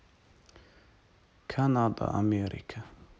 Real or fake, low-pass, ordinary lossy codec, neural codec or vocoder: real; none; none; none